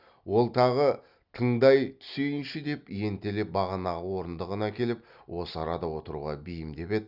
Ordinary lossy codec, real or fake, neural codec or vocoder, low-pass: Opus, 64 kbps; real; none; 5.4 kHz